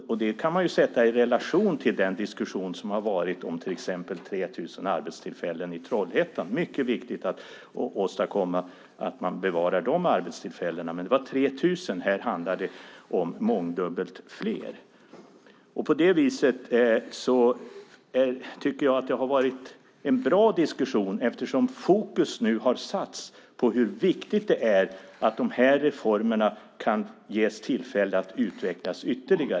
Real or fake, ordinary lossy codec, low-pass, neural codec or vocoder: real; none; none; none